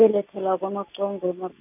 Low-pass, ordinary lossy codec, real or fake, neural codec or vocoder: 3.6 kHz; none; real; none